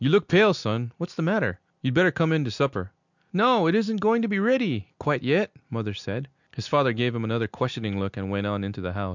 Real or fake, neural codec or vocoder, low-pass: real; none; 7.2 kHz